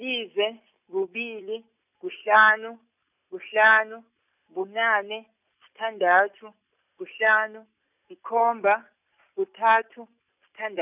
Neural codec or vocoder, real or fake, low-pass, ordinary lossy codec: none; real; 3.6 kHz; none